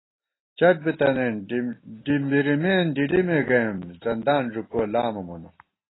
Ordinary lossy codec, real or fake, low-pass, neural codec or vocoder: AAC, 16 kbps; real; 7.2 kHz; none